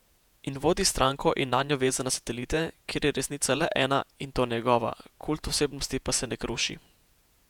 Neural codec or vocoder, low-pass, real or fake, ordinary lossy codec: vocoder, 48 kHz, 128 mel bands, Vocos; 19.8 kHz; fake; none